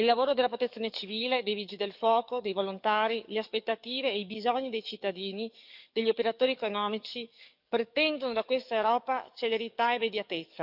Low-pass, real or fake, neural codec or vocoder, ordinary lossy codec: 5.4 kHz; fake; codec, 44.1 kHz, 7.8 kbps, DAC; none